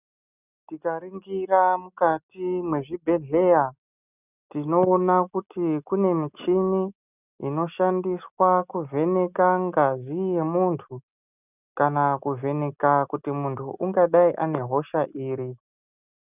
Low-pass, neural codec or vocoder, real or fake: 3.6 kHz; none; real